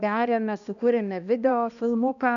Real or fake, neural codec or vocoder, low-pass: fake; codec, 16 kHz, 1 kbps, X-Codec, HuBERT features, trained on balanced general audio; 7.2 kHz